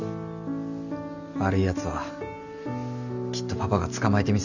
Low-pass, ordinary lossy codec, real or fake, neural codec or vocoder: 7.2 kHz; none; real; none